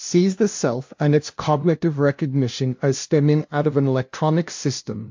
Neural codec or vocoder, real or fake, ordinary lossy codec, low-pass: codec, 16 kHz, 0.5 kbps, FunCodec, trained on LibriTTS, 25 frames a second; fake; MP3, 48 kbps; 7.2 kHz